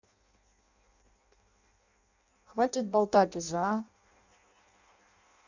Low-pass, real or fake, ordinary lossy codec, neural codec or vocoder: 7.2 kHz; fake; none; codec, 16 kHz in and 24 kHz out, 0.6 kbps, FireRedTTS-2 codec